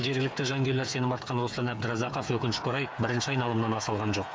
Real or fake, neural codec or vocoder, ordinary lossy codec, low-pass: fake; codec, 16 kHz, 8 kbps, FreqCodec, smaller model; none; none